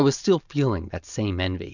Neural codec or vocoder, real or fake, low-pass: none; real; 7.2 kHz